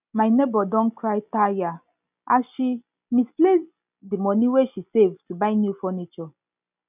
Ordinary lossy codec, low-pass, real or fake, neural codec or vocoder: none; 3.6 kHz; real; none